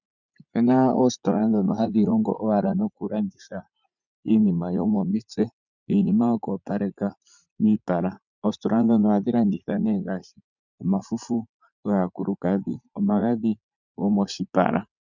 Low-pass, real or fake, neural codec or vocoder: 7.2 kHz; fake; vocoder, 44.1 kHz, 80 mel bands, Vocos